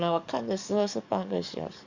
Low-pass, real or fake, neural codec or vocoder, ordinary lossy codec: 7.2 kHz; fake; codec, 44.1 kHz, 7.8 kbps, DAC; Opus, 64 kbps